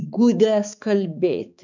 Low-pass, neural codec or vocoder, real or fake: 7.2 kHz; codec, 16 kHz, 4 kbps, X-Codec, HuBERT features, trained on balanced general audio; fake